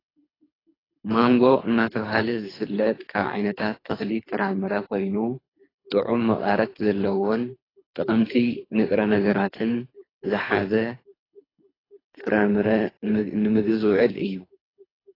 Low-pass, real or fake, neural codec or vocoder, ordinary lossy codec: 5.4 kHz; fake; codec, 24 kHz, 3 kbps, HILCodec; AAC, 24 kbps